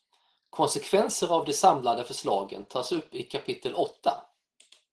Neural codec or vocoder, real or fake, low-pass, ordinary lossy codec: none; real; 10.8 kHz; Opus, 16 kbps